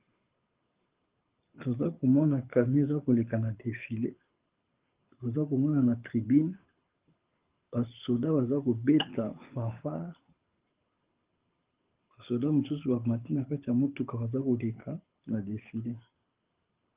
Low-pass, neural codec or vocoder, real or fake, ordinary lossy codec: 3.6 kHz; codec, 24 kHz, 6 kbps, HILCodec; fake; Opus, 32 kbps